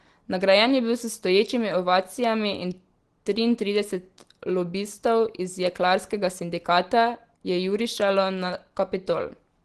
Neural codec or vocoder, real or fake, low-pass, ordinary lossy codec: none; real; 10.8 kHz; Opus, 16 kbps